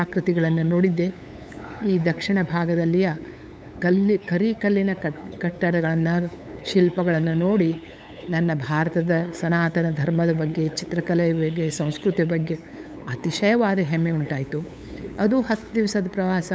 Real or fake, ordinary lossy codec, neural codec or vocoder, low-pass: fake; none; codec, 16 kHz, 8 kbps, FunCodec, trained on LibriTTS, 25 frames a second; none